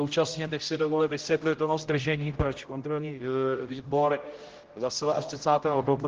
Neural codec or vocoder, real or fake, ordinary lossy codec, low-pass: codec, 16 kHz, 0.5 kbps, X-Codec, HuBERT features, trained on general audio; fake; Opus, 16 kbps; 7.2 kHz